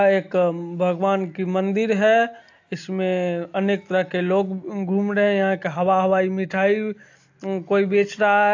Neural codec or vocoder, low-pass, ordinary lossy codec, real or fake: none; 7.2 kHz; AAC, 48 kbps; real